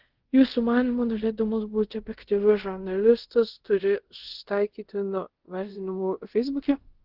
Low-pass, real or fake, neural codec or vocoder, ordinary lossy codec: 5.4 kHz; fake; codec, 24 kHz, 0.5 kbps, DualCodec; Opus, 16 kbps